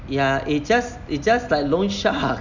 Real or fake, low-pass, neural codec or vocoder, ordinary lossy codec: real; 7.2 kHz; none; none